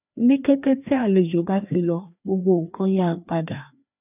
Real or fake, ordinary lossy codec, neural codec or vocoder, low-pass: fake; none; codec, 16 kHz, 2 kbps, FreqCodec, larger model; 3.6 kHz